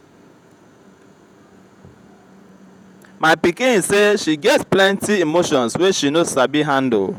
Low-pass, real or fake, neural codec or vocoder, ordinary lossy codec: 19.8 kHz; fake; vocoder, 48 kHz, 128 mel bands, Vocos; none